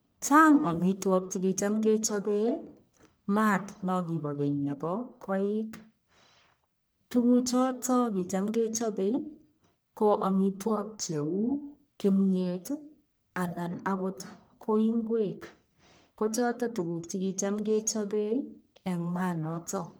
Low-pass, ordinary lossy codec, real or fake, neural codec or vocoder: none; none; fake; codec, 44.1 kHz, 1.7 kbps, Pupu-Codec